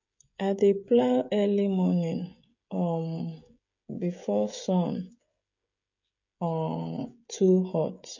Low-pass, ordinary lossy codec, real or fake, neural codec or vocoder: 7.2 kHz; MP3, 48 kbps; fake; codec, 16 kHz, 16 kbps, FreqCodec, smaller model